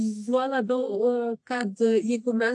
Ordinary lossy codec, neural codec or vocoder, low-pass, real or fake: AAC, 64 kbps; codec, 24 kHz, 0.9 kbps, WavTokenizer, medium music audio release; 10.8 kHz; fake